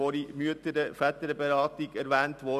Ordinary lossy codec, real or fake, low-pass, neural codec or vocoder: none; real; 14.4 kHz; none